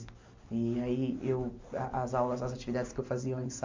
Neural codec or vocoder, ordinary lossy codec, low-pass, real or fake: none; MP3, 64 kbps; 7.2 kHz; real